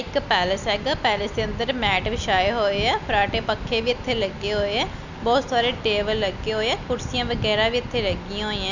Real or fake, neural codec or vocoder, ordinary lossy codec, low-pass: real; none; none; 7.2 kHz